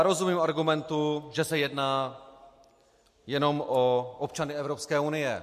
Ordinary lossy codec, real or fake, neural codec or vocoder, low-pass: MP3, 64 kbps; real; none; 14.4 kHz